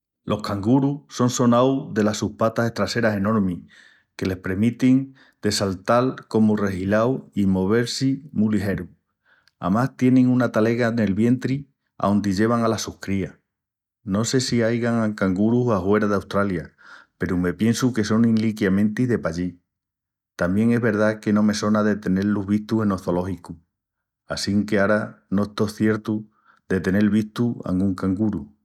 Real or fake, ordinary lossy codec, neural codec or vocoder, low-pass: real; none; none; 19.8 kHz